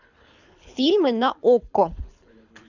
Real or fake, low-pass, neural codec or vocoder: fake; 7.2 kHz; codec, 24 kHz, 6 kbps, HILCodec